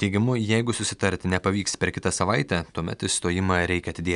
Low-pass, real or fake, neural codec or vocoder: 10.8 kHz; real; none